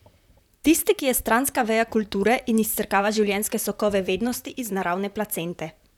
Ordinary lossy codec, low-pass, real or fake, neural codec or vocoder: none; 19.8 kHz; fake; vocoder, 44.1 kHz, 128 mel bands, Pupu-Vocoder